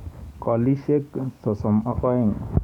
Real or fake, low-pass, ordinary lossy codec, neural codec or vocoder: fake; 19.8 kHz; none; codec, 44.1 kHz, 7.8 kbps, DAC